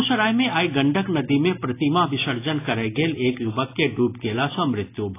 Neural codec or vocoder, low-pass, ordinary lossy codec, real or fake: none; 3.6 kHz; AAC, 24 kbps; real